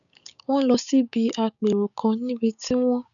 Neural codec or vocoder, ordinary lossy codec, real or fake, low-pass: codec, 16 kHz, 6 kbps, DAC; none; fake; 7.2 kHz